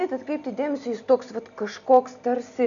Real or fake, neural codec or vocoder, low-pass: real; none; 7.2 kHz